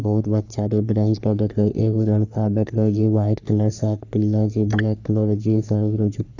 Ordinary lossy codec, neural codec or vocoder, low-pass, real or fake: none; codec, 44.1 kHz, 3.4 kbps, Pupu-Codec; 7.2 kHz; fake